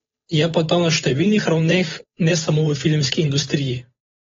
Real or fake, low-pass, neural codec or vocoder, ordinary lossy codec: fake; 7.2 kHz; codec, 16 kHz, 8 kbps, FunCodec, trained on Chinese and English, 25 frames a second; AAC, 24 kbps